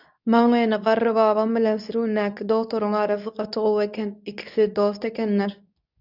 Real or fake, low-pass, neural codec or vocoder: fake; 5.4 kHz; codec, 24 kHz, 0.9 kbps, WavTokenizer, medium speech release version 2